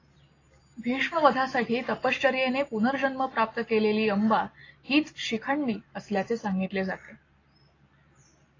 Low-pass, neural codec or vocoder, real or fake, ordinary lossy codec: 7.2 kHz; none; real; AAC, 32 kbps